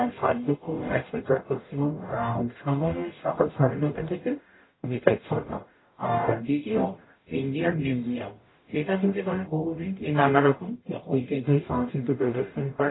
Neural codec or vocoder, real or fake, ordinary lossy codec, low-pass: codec, 44.1 kHz, 0.9 kbps, DAC; fake; AAC, 16 kbps; 7.2 kHz